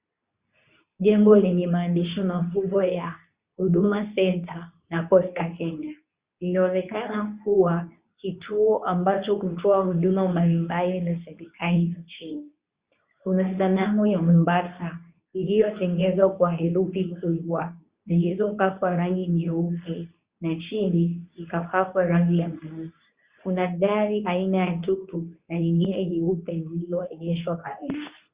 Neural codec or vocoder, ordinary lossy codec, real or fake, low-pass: codec, 24 kHz, 0.9 kbps, WavTokenizer, medium speech release version 2; Opus, 64 kbps; fake; 3.6 kHz